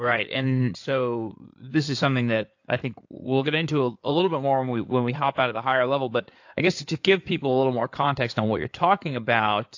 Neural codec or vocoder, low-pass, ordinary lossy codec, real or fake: codec, 16 kHz in and 24 kHz out, 2.2 kbps, FireRedTTS-2 codec; 7.2 kHz; AAC, 48 kbps; fake